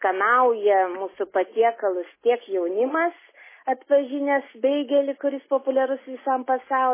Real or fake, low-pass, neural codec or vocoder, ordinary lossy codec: real; 3.6 kHz; none; MP3, 16 kbps